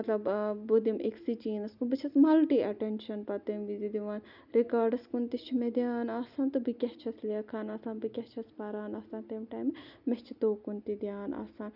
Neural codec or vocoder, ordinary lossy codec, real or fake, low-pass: none; none; real; 5.4 kHz